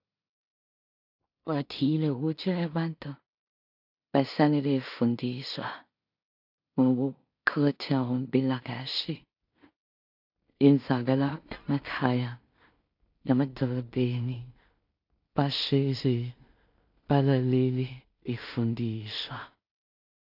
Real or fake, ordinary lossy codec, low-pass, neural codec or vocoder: fake; AAC, 48 kbps; 5.4 kHz; codec, 16 kHz in and 24 kHz out, 0.4 kbps, LongCat-Audio-Codec, two codebook decoder